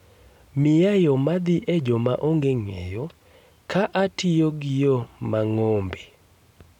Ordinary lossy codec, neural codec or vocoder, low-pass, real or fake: none; none; 19.8 kHz; real